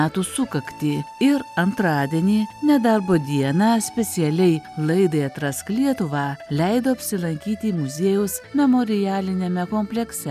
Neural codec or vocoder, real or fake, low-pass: none; real; 14.4 kHz